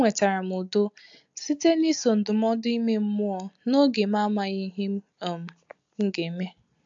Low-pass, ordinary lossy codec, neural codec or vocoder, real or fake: 7.2 kHz; AAC, 64 kbps; none; real